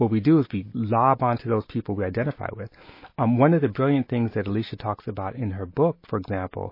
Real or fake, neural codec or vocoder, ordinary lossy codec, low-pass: real; none; MP3, 24 kbps; 5.4 kHz